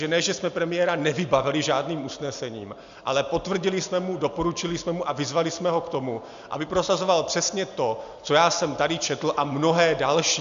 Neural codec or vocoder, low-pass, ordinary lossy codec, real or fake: none; 7.2 kHz; MP3, 64 kbps; real